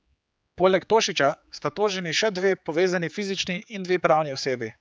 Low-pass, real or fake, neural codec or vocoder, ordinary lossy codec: none; fake; codec, 16 kHz, 4 kbps, X-Codec, HuBERT features, trained on general audio; none